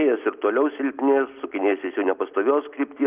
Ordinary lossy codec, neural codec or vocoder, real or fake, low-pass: Opus, 32 kbps; none; real; 3.6 kHz